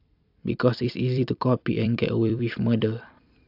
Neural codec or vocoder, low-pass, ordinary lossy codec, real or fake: vocoder, 44.1 kHz, 128 mel bands every 256 samples, BigVGAN v2; 5.4 kHz; AAC, 48 kbps; fake